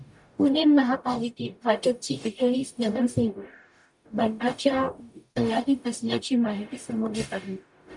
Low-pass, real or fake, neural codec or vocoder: 10.8 kHz; fake; codec, 44.1 kHz, 0.9 kbps, DAC